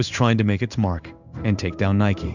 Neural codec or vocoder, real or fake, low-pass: codec, 16 kHz in and 24 kHz out, 1 kbps, XY-Tokenizer; fake; 7.2 kHz